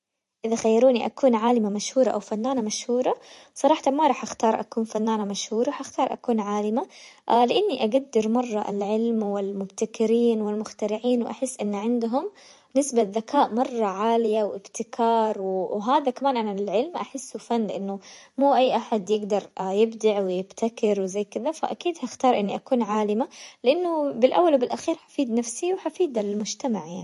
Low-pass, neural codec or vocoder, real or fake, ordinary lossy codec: 14.4 kHz; vocoder, 44.1 kHz, 128 mel bands every 512 samples, BigVGAN v2; fake; MP3, 48 kbps